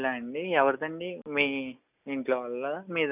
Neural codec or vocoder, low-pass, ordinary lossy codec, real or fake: none; 3.6 kHz; none; real